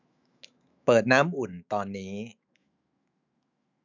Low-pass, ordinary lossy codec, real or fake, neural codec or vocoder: 7.2 kHz; none; fake; autoencoder, 48 kHz, 128 numbers a frame, DAC-VAE, trained on Japanese speech